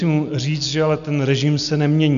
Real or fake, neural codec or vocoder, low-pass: real; none; 7.2 kHz